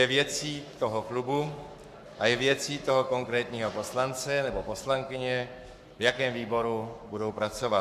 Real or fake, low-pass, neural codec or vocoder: fake; 14.4 kHz; codec, 44.1 kHz, 7.8 kbps, Pupu-Codec